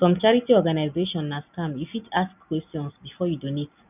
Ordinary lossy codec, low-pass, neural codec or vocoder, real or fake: none; 3.6 kHz; none; real